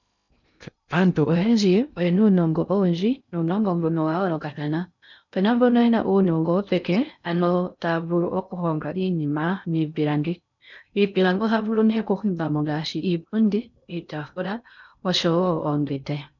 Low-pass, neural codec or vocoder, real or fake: 7.2 kHz; codec, 16 kHz in and 24 kHz out, 0.6 kbps, FocalCodec, streaming, 2048 codes; fake